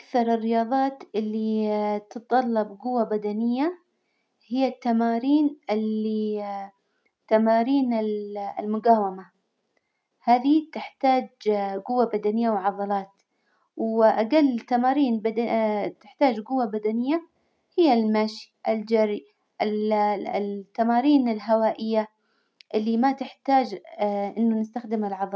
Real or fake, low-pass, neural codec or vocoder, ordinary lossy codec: real; none; none; none